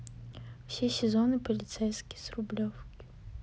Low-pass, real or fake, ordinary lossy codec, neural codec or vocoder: none; real; none; none